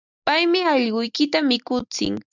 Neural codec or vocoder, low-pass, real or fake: none; 7.2 kHz; real